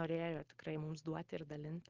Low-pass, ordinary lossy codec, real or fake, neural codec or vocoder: 7.2 kHz; Opus, 16 kbps; real; none